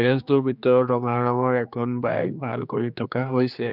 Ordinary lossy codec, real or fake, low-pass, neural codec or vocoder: none; fake; 5.4 kHz; codec, 16 kHz, 2 kbps, X-Codec, HuBERT features, trained on general audio